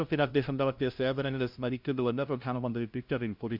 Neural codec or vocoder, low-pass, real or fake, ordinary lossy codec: codec, 16 kHz, 0.5 kbps, FunCodec, trained on LibriTTS, 25 frames a second; 5.4 kHz; fake; Opus, 64 kbps